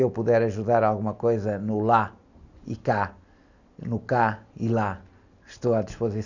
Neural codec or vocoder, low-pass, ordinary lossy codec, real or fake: none; 7.2 kHz; none; real